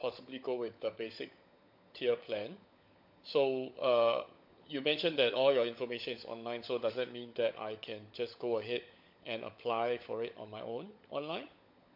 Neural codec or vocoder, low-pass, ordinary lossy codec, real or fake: codec, 16 kHz, 16 kbps, FunCodec, trained on LibriTTS, 50 frames a second; 5.4 kHz; MP3, 48 kbps; fake